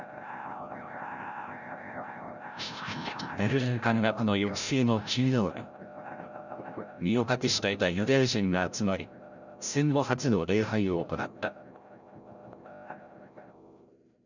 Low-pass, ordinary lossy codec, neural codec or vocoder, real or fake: 7.2 kHz; none; codec, 16 kHz, 0.5 kbps, FreqCodec, larger model; fake